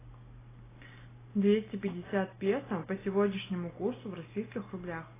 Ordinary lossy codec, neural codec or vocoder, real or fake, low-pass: AAC, 16 kbps; none; real; 3.6 kHz